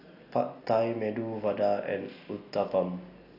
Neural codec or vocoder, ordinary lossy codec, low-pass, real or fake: none; AAC, 32 kbps; 5.4 kHz; real